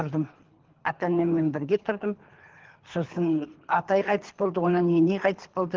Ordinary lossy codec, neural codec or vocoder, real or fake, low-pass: Opus, 16 kbps; codec, 24 kHz, 3 kbps, HILCodec; fake; 7.2 kHz